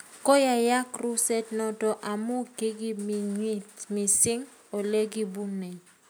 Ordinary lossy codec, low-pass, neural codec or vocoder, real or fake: none; none; none; real